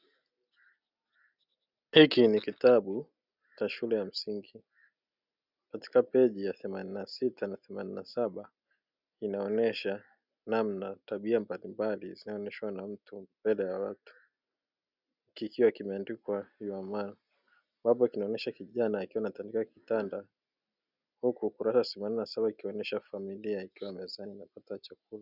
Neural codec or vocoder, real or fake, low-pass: none; real; 5.4 kHz